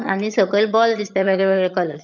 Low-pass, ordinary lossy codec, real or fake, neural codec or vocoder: 7.2 kHz; none; fake; vocoder, 22.05 kHz, 80 mel bands, HiFi-GAN